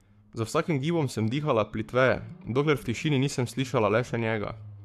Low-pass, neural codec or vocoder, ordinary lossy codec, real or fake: 14.4 kHz; codec, 44.1 kHz, 7.8 kbps, Pupu-Codec; AAC, 96 kbps; fake